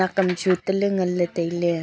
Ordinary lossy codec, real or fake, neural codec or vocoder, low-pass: none; real; none; none